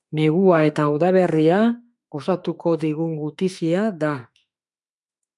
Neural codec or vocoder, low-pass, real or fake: autoencoder, 48 kHz, 32 numbers a frame, DAC-VAE, trained on Japanese speech; 10.8 kHz; fake